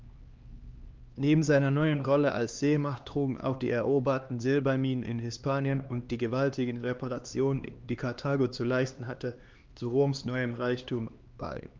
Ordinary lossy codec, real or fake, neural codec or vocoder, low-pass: Opus, 24 kbps; fake; codec, 16 kHz, 2 kbps, X-Codec, HuBERT features, trained on LibriSpeech; 7.2 kHz